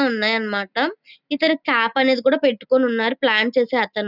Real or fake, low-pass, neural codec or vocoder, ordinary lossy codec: real; 5.4 kHz; none; none